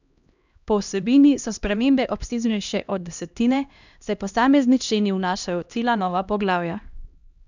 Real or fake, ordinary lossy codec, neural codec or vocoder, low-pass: fake; none; codec, 16 kHz, 1 kbps, X-Codec, HuBERT features, trained on LibriSpeech; 7.2 kHz